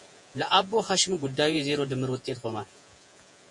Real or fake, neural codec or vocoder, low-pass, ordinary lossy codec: fake; vocoder, 48 kHz, 128 mel bands, Vocos; 10.8 kHz; MP3, 48 kbps